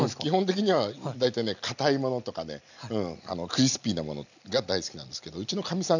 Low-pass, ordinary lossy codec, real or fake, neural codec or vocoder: 7.2 kHz; none; real; none